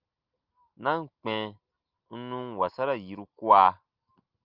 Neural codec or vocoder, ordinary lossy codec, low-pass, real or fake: none; Opus, 24 kbps; 5.4 kHz; real